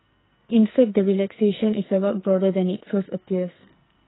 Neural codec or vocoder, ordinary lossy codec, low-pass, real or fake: codec, 44.1 kHz, 2.6 kbps, SNAC; AAC, 16 kbps; 7.2 kHz; fake